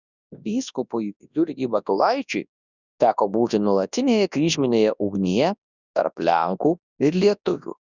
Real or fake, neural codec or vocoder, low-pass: fake; codec, 24 kHz, 0.9 kbps, WavTokenizer, large speech release; 7.2 kHz